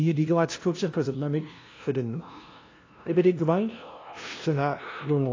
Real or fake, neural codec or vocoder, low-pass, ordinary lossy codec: fake; codec, 16 kHz, 0.5 kbps, FunCodec, trained on LibriTTS, 25 frames a second; 7.2 kHz; AAC, 32 kbps